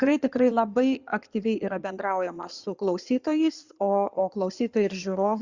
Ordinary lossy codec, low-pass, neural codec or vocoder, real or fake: Opus, 64 kbps; 7.2 kHz; codec, 16 kHz in and 24 kHz out, 2.2 kbps, FireRedTTS-2 codec; fake